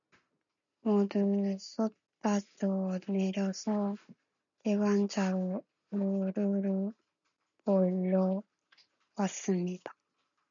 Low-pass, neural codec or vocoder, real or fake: 7.2 kHz; none; real